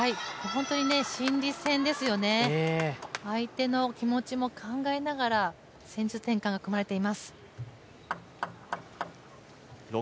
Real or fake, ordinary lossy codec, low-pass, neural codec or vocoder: real; none; none; none